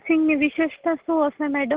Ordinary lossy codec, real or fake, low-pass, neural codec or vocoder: Opus, 16 kbps; real; 3.6 kHz; none